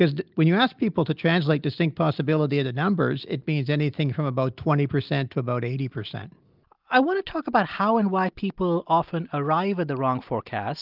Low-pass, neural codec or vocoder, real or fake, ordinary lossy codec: 5.4 kHz; none; real; Opus, 24 kbps